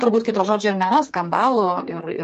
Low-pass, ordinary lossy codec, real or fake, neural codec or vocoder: 14.4 kHz; MP3, 48 kbps; fake; codec, 32 kHz, 1.9 kbps, SNAC